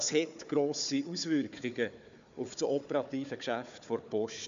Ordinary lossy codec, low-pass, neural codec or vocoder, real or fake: MP3, 64 kbps; 7.2 kHz; codec, 16 kHz, 4 kbps, FunCodec, trained on Chinese and English, 50 frames a second; fake